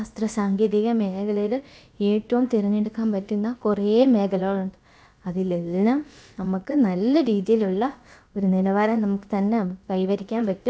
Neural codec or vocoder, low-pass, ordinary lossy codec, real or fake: codec, 16 kHz, about 1 kbps, DyCAST, with the encoder's durations; none; none; fake